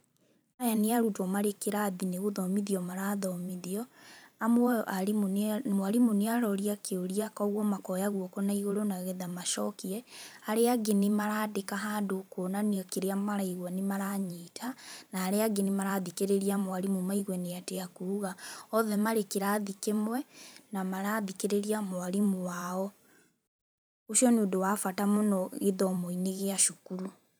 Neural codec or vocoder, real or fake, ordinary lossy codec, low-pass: vocoder, 44.1 kHz, 128 mel bands every 512 samples, BigVGAN v2; fake; none; none